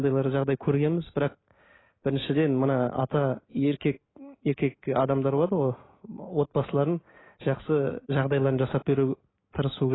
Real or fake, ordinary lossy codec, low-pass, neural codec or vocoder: real; AAC, 16 kbps; 7.2 kHz; none